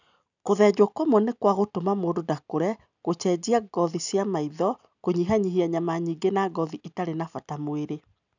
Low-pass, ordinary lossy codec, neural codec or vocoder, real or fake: 7.2 kHz; none; none; real